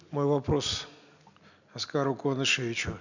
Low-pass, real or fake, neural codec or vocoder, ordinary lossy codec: 7.2 kHz; real; none; none